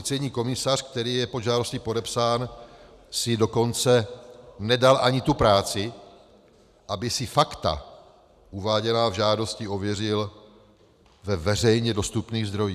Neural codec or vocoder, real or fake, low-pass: vocoder, 44.1 kHz, 128 mel bands every 512 samples, BigVGAN v2; fake; 14.4 kHz